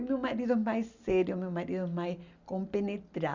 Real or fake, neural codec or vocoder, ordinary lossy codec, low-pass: real; none; Opus, 64 kbps; 7.2 kHz